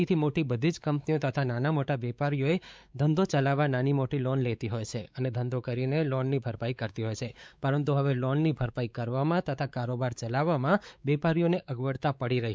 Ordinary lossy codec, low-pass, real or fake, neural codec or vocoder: none; none; fake; codec, 16 kHz, 4 kbps, X-Codec, WavLM features, trained on Multilingual LibriSpeech